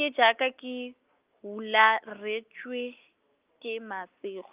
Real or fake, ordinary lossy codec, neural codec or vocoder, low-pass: real; Opus, 32 kbps; none; 3.6 kHz